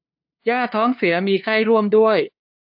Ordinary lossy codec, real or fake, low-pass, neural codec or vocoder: AAC, 48 kbps; fake; 5.4 kHz; codec, 16 kHz, 2 kbps, FunCodec, trained on LibriTTS, 25 frames a second